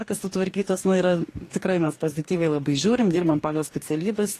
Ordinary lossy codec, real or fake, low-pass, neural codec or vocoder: AAC, 48 kbps; fake; 14.4 kHz; codec, 44.1 kHz, 2.6 kbps, DAC